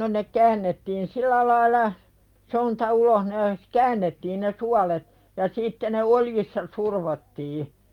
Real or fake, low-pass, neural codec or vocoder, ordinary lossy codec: real; 19.8 kHz; none; Opus, 32 kbps